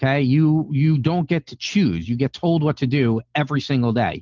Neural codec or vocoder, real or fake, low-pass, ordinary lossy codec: none; real; 7.2 kHz; Opus, 32 kbps